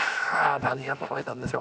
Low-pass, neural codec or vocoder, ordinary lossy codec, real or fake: none; codec, 16 kHz, 0.7 kbps, FocalCodec; none; fake